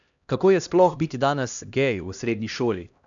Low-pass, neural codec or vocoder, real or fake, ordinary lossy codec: 7.2 kHz; codec, 16 kHz, 1 kbps, X-Codec, HuBERT features, trained on LibriSpeech; fake; none